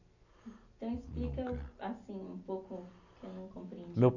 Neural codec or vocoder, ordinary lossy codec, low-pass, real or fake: none; none; 7.2 kHz; real